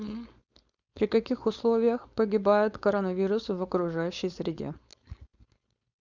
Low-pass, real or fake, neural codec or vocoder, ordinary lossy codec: 7.2 kHz; fake; codec, 16 kHz, 4.8 kbps, FACodec; Opus, 64 kbps